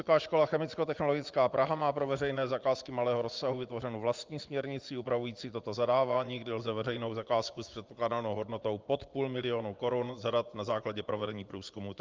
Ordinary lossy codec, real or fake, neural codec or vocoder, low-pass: Opus, 24 kbps; fake; vocoder, 24 kHz, 100 mel bands, Vocos; 7.2 kHz